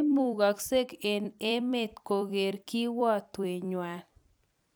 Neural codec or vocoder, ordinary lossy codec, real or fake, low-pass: vocoder, 44.1 kHz, 128 mel bands every 256 samples, BigVGAN v2; none; fake; none